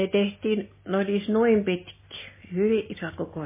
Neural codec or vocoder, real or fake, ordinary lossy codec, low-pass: none; real; MP3, 16 kbps; 3.6 kHz